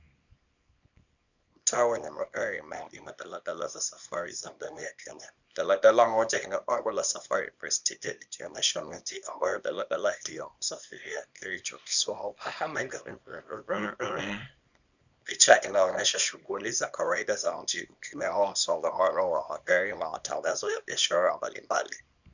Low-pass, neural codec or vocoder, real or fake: 7.2 kHz; codec, 24 kHz, 0.9 kbps, WavTokenizer, small release; fake